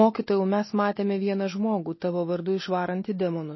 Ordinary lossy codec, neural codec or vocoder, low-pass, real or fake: MP3, 24 kbps; none; 7.2 kHz; real